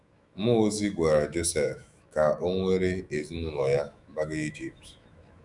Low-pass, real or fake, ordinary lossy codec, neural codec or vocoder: 10.8 kHz; fake; none; autoencoder, 48 kHz, 128 numbers a frame, DAC-VAE, trained on Japanese speech